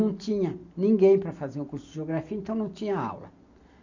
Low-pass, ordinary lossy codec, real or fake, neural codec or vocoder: 7.2 kHz; none; real; none